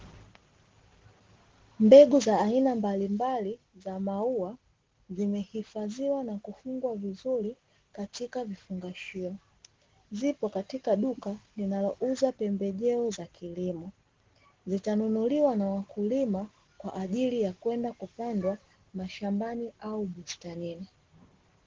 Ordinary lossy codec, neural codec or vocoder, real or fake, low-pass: Opus, 16 kbps; none; real; 7.2 kHz